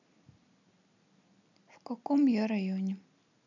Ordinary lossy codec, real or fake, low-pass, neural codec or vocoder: none; real; 7.2 kHz; none